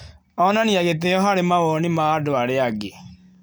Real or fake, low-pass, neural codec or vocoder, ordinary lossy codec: real; none; none; none